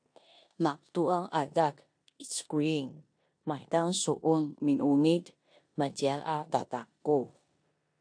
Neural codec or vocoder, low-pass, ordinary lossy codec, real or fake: codec, 16 kHz in and 24 kHz out, 0.9 kbps, LongCat-Audio-Codec, four codebook decoder; 9.9 kHz; AAC, 64 kbps; fake